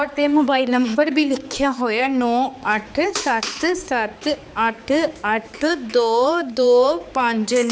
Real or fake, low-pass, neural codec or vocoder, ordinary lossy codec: fake; none; codec, 16 kHz, 4 kbps, X-Codec, HuBERT features, trained on balanced general audio; none